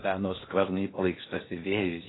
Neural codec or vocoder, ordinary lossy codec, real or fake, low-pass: codec, 16 kHz in and 24 kHz out, 0.8 kbps, FocalCodec, streaming, 65536 codes; AAC, 16 kbps; fake; 7.2 kHz